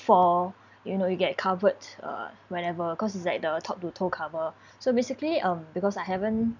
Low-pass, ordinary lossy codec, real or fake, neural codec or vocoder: 7.2 kHz; none; real; none